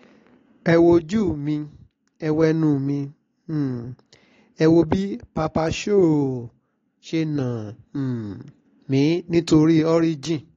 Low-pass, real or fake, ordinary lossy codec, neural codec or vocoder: 7.2 kHz; real; AAC, 32 kbps; none